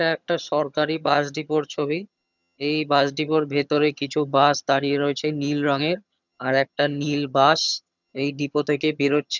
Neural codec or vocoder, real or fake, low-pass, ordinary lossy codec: vocoder, 22.05 kHz, 80 mel bands, HiFi-GAN; fake; 7.2 kHz; none